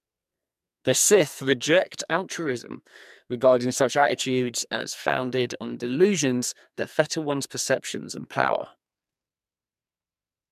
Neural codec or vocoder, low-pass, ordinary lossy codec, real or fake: codec, 44.1 kHz, 2.6 kbps, SNAC; 14.4 kHz; MP3, 96 kbps; fake